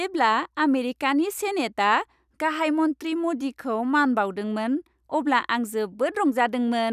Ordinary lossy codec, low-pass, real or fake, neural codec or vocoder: none; 14.4 kHz; real; none